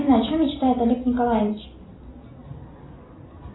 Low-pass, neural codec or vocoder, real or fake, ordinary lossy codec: 7.2 kHz; none; real; AAC, 16 kbps